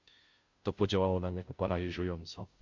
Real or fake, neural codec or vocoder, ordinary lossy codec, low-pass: fake; codec, 16 kHz, 0.5 kbps, FunCodec, trained on Chinese and English, 25 frames a second; MP3, 64 kbps; 7.2 kHz